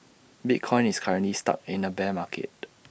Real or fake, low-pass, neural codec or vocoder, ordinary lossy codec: real; none; none; none